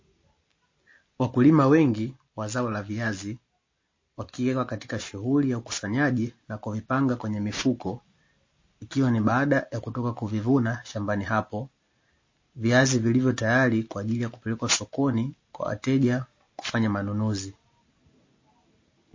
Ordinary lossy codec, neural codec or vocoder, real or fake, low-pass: MP3, 32 kbps; none; real; 7.2 kHz